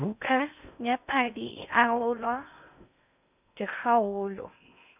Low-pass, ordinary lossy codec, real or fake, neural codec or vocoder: 3.6 kHz; none; fake; codec, 16 kHz in and 24 kHz out, 0.6 kbps, FocalCodec, streaming, 4096 codes